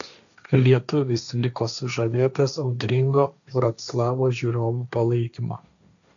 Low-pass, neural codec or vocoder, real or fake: 7.2 kHz; codec, 16 kHz, 1.1 kbps, Voila-Tokenizer; fake